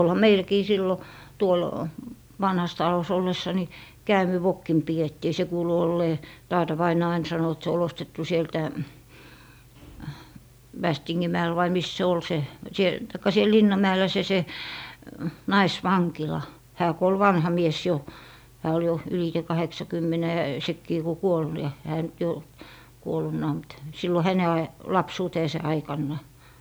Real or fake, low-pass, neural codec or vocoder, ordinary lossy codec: real; none; none; none